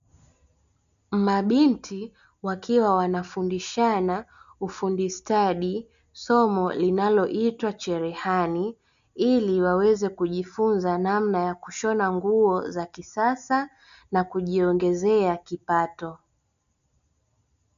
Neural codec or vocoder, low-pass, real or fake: none; 7.2 kHz; real